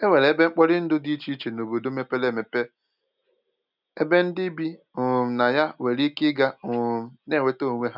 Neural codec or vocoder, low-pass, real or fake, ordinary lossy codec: none; 5.4 kHz; real; none